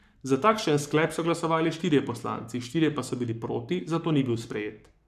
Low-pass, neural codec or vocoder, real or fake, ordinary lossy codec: 14.4 kHz; codec, 44.1 kHz, 7.8 kbps, Pupu-Codec; fake; none